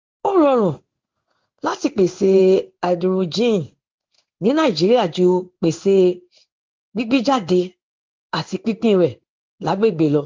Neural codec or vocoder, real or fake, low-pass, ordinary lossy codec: codec, 16 kHz in and 24 kHz out, 1 kbps, XY-Tokenizer; fake; 7.2 kHz; Opus, 32 kbps